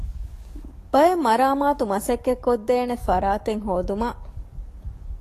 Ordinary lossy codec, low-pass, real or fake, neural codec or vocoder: AAC, 48 kbps; 14.4 kHz; fake; codec, 44.1 kHz, 7.8 kbps, DAC